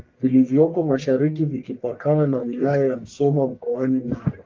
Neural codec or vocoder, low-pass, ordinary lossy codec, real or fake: codec, 44.1 kHz, 1.7 kbps, Pupu-Codec; 7.2 kHz; Opus, 24 kbps; fake